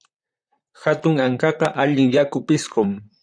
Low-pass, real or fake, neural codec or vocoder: 9.9 kHz; fake; vocoder, 44.1 kHz, 128 mel bands, Pupu-Vocoder